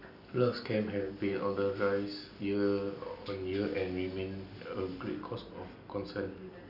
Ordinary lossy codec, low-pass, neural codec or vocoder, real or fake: none; 5.4 kHz; none; real